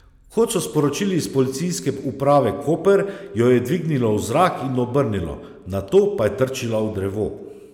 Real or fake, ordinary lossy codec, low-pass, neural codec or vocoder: real; none; 19.8 kHz; none